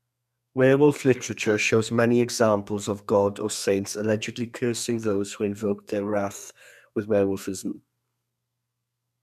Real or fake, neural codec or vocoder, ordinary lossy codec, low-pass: fake; codec, 32 kHz, 1.9 kbps, SNAC; none; 14.4 kHz